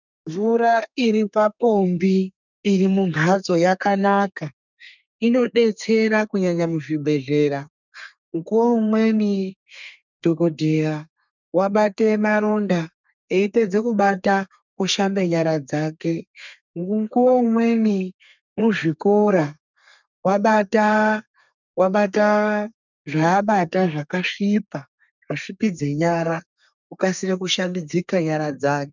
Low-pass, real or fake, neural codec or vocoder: 7.2 kHz; fake; codec, 32 kHz, 1.9 kbps, SNAC